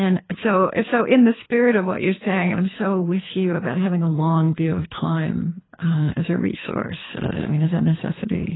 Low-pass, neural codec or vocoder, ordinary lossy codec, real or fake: 7.2 kHz; codec, 24 kHz, 3 kbps, HILCodec; AAC, 16 kbps; fake